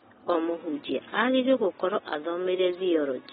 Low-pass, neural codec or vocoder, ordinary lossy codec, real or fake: 19.8 kHz; none; AAC, 16 kbps; real